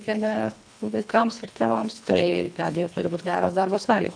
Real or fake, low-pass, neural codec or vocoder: fake; 9.9 kHz; codec, 24 kHz, 1.5 kbps, HILCodec